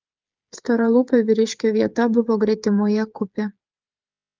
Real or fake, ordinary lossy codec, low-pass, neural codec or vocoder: fake; Opus, 24 kbps; 7.2 kHz; codec, 16 kHz, 8 kbps, FreqCodec, smaller model